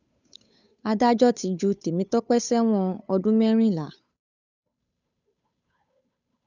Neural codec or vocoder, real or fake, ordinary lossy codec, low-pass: codec, 16 kHz, 8 kbps, FunCodec, trained on Chinese and English, 25 frames a second; fake; none; 7.2 kHz